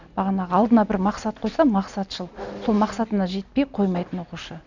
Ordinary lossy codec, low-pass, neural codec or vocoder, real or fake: none; 7.2 kHz; none; real